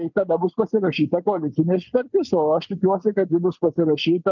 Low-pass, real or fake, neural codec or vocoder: 7.2 kHz; real; none